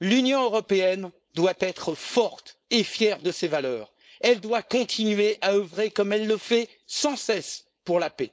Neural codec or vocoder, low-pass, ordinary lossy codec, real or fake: codec, 16 kHz, 4.8 kbps, FACodec; none; none; fake